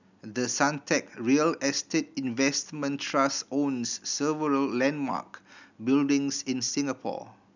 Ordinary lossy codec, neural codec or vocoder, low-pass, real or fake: none; none; 7.2 kHz; real